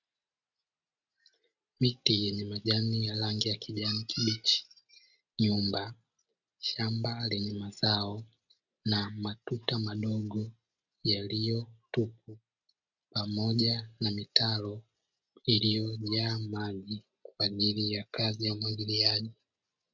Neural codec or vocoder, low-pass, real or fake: none; 7.2 kHz; real